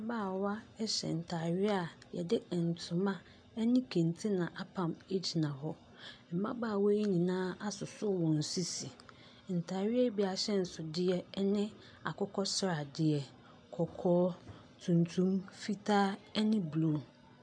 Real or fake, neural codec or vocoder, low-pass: real; none; 9.9 kHz